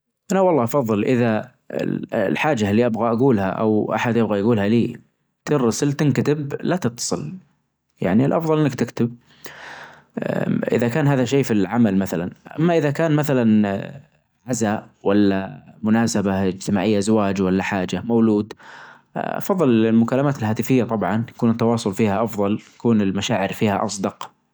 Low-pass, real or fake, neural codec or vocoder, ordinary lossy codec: none; real; none; none